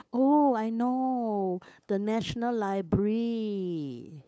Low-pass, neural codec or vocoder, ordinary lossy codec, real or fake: none; codec, 16 kHz, 8 kbps, FunCodec, trained on LibriTTS, 25 frames a second; none; fake